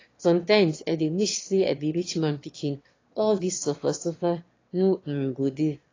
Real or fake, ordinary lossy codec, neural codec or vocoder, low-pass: fake; AAC, 32 kbps; autoencoder, 22.05 kHz, a latent of 192 numbers a frame, VITS, trained on one speaker; 7.2 kHz